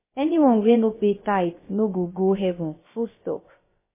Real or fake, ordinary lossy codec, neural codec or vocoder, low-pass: fake; MP3, 16 kbps; codec, 16 kHz, about 1 kbps, DyCAST, with the encoder's durations; 3.6 kHz